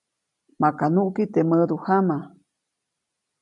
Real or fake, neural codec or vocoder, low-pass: real; none; 10.8 kHz